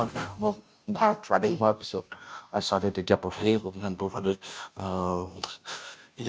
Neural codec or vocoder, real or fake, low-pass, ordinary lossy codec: codec, 16 kHz, 0.5 kbps, FunCodec, trained on Chinese and English, 25 frames a second; fake; none; none